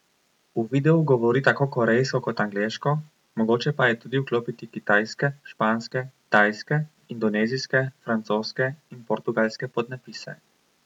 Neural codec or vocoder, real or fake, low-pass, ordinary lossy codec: none; real; 19.8 kHz; none